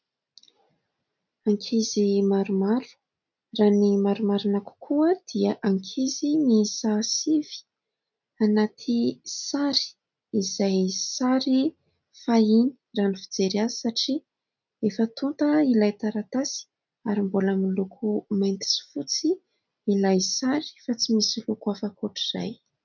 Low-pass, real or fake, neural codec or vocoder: 7.2 kHz; real; none